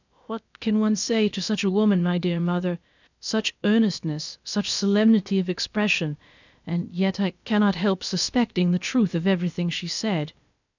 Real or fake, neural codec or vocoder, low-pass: fake; codec, 16 kHz, about 1 kbps, DyCAST, with the encoder's durations; 7.2 kHz